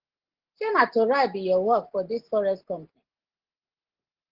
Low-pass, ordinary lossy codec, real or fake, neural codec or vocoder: 5.4 kHz; Opus, 16 kbps; real; none